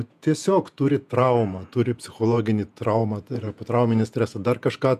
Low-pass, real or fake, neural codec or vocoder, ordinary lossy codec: 14.4 kHz; fake; vocoder, 48 kHz, 128 mel bands, Vocos; MP3, 96 kbps